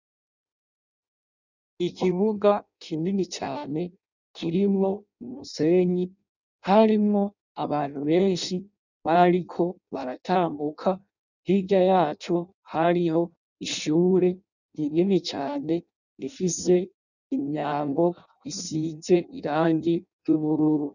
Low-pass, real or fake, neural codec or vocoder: 7.2 kHz; fake; codec, 16 kHz in and 24 kHz out, 0.6 kbps, FireRedTTS-2 codec